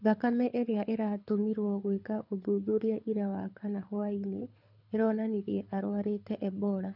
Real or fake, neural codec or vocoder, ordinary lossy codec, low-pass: fake; codec, 24 kHz, 6 kbps, HILCodec; none; 5.4 kHz